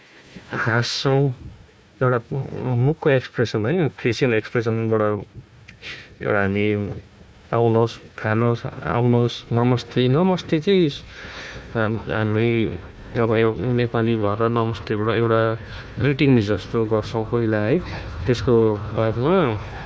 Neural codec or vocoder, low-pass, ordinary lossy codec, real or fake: codec, 16 kHz, 1 kbps, FunCodec, trained on Chinese and English, 50 frames a second; none; none; fake